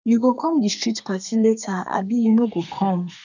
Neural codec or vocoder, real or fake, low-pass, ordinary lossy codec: codec, 32 kHz, 1.9 kbps, SNAC; fake; 7.2 kHz; none